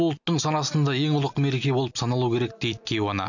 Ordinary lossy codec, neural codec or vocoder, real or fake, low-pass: none; none; real; 7.2 kHz